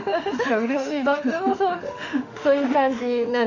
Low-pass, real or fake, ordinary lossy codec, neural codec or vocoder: 7.2 kHz; fake; none; autoencoder, 48 kHz, 32 numbers a frame, DAC-VAE, trained on Japanese speech